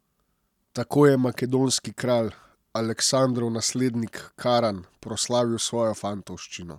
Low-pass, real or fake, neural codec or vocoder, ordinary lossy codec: 19.8 kHz; real; none; none